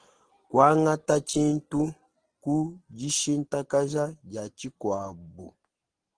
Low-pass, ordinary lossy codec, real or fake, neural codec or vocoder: 9.9 kHz; Opus, 16 kbps; real; none